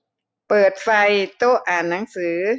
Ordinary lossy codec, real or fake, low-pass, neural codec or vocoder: none; real; none; none